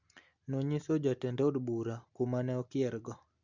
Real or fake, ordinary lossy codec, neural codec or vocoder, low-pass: real; Opus, 64 kbps; none; 7.2 kHz